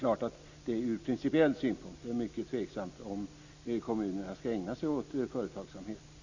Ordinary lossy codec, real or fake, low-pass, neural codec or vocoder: Opus, 64 kbps; real; 7.2 kHz; none